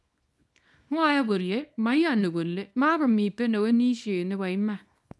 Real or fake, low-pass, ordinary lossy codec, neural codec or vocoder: fake; none; none; codec, 24 kHz, 0.9 kbps, WavTokenizer, small release